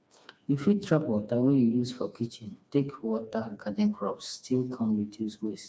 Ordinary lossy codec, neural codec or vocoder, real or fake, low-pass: none; codec, 16 kHz, 2 kbps, FreqCodec, smaller model; fake; none